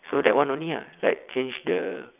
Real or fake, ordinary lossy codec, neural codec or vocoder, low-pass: fake; none; vocoder, 22.05 kHz, 80 mel bands, WaveNeXt; 3.6 kHz